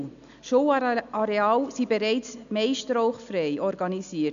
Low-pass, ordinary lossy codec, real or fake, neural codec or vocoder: 7.2 kHz; none; real; none